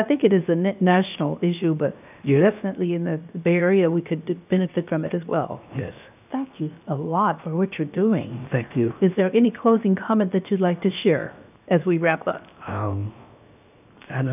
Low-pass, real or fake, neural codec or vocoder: 3.6 kHz; fake; codec, 16 kHz, 0.7 kbps, FocalCodec